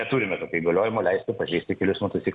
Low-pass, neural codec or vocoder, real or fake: 10.8 kHz; none; real